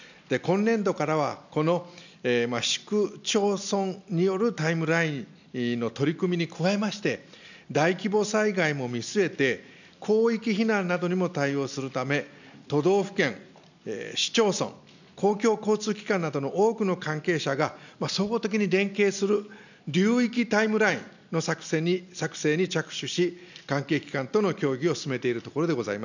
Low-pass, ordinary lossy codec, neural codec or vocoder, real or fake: 7.2 kHz; none; none; real